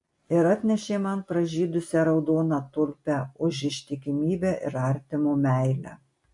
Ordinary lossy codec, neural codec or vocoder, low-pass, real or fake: MP3, 48 kbps; none; 10.8 kHz; real